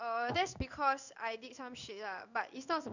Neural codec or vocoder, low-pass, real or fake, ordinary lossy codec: codec, 16 kHz in and 24 kHz out, 1 kbps, XY-Tokenizer; 7.2 kHz; fake; none